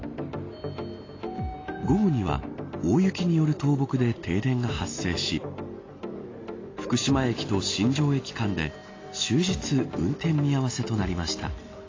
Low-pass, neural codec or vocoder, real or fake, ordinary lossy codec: 7.2 kHz; none; real; AAC, 32 kbps